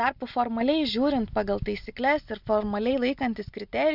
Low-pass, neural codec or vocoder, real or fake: 5.4 kHz; none; real